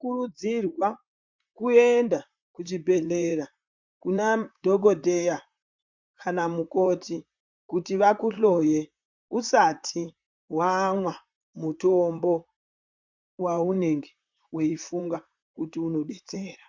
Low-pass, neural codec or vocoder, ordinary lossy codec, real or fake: 7.2 kHz; vocoder, 44.1 kHz, 128 mel bands, Pupu-Vocoder; MP3, 64 kbps; fake